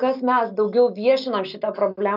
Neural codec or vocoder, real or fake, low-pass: none; real; 5.4 kHz